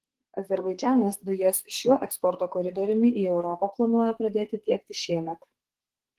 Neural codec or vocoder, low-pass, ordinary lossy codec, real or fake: codec, 32 kHz, 1.9 kbps, SNAC; 14.4 kHz; Opus, 16 kbps; fake